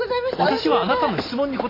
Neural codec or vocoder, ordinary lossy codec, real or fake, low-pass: none; AAC, 24 kbps; real; 5.4 kHz